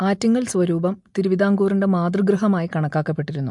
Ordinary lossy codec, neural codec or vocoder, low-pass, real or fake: MP3, 64 kbps; none; 10.8 kHz; real